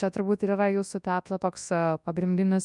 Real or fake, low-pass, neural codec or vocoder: fake; 10.8 kHz; codec, 24 kHz, 0.9 kbps, WavTokenizer, large speech release